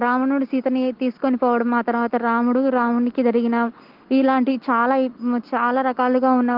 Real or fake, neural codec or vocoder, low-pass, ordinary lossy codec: real; none; 5.4 kHz; Opus, 16 kbps